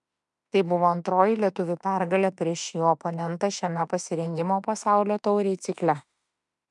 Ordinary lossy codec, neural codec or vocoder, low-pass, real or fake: MP3, 96 kbps; autoencoder, 48 kHz, 32 numbers a frame, DAC-VAE, trained on Japanese speech; 10.8 kHz; fake